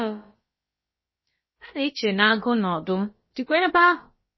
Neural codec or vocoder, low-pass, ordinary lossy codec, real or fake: codec, 16 kHz, about 1 kbps, DyCAST, with the encoder's durations; 7.2 kHz; MP3, 24 kbps; fake